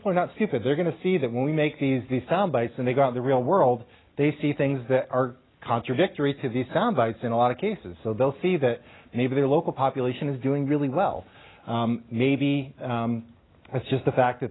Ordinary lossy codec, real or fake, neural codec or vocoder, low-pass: AAC, 16 kbps; real; none; 7.2 kHz